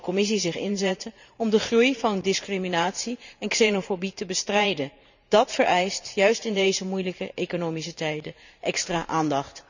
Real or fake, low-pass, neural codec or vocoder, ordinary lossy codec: fake; 7.2 kHz; vocoder, 44.1 kHz, 128 mel bands every 512 samples, BigVGAN v2; none